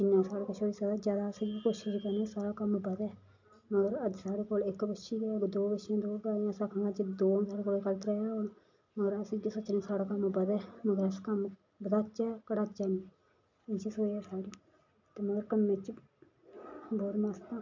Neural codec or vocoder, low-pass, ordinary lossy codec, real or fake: none; 7.2 kHz; MP3, 64 kbps; real